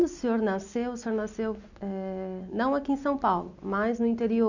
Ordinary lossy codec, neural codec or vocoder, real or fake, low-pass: none; none; real; 7.2 kHz